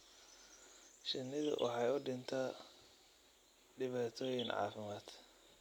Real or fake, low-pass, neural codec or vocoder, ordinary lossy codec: real; 19.8 kHz; none; none